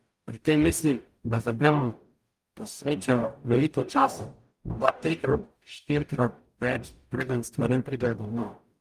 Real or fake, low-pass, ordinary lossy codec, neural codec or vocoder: fake; 14.4 kHz; Opus, 32 kbps; codec, 44.1 kHz, 0.9 kbps, DAC